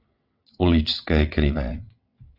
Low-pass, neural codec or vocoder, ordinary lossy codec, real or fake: 5.4 kHz; vocoder, 22.05 kHz, 80 mel bands, WaveNeXt; AAC, 48 kbps; fake